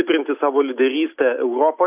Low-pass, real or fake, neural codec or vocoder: 3.6 kHz; real; none